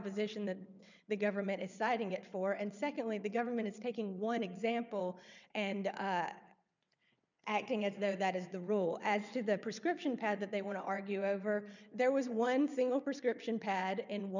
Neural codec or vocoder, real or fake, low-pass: vocoder, 22.05 kHz, 80 mel bands, WaveNeXt; fake; 7.2 kHz